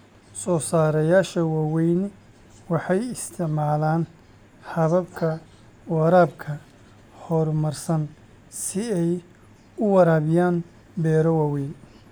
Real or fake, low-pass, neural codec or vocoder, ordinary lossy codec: real; none; none; none